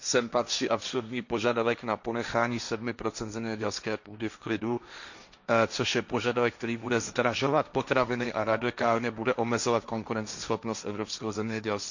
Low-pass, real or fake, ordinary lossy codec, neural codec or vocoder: 7.2 kHz; fake; none; codec, 16 kHz, 1.1 kbps, Voila-Tokenizer